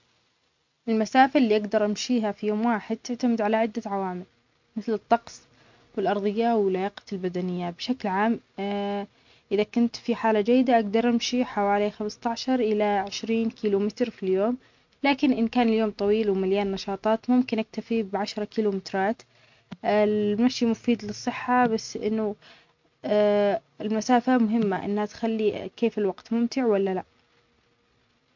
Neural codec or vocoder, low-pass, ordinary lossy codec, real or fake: none; 7.2 kHz; MP3, 48 kbps; real